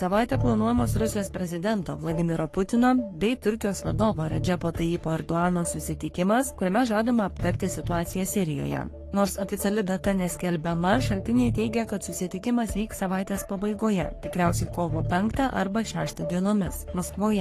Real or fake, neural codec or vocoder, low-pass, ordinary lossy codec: fake; codec, 44.1 kHz, 3.4 kbps, Pupu-Codec; 14.4 kHz; AAC, 48 kbps